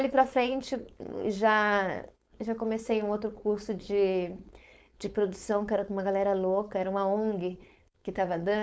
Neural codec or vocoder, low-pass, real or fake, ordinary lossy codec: codec, 16 kHz, 4.8 kbps, FACodec; none; fake; none